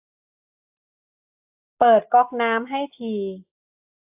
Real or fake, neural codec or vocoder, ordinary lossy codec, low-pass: real; none; AAC, 32 kbps; 3.6 kHz